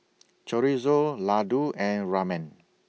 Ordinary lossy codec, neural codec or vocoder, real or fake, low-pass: none; none; real; none